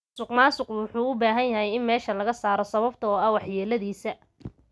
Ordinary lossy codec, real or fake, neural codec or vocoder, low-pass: none; real; none; none